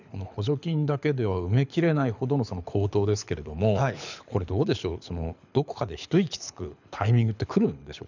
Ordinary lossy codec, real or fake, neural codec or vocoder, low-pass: none; fake; codec, 24 kHz, 6 kbps, HILCodec; 7.2 kHz